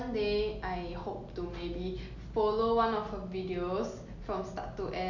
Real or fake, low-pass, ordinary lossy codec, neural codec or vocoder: real; 7.2 kHz; none; none